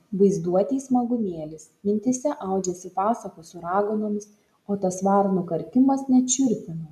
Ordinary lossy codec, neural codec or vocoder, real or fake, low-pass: MP3, 96 kbps; none; real; 14.4 kHz